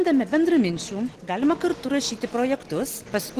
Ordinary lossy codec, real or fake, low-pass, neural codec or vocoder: Opus, 16 kbps; real; 14.4 kHz; none